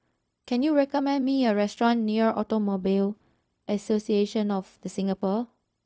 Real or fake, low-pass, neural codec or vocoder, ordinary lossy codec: fake; none; codec, 16 kHz, 0.4 kbps, LongCat-Audio-Codec; none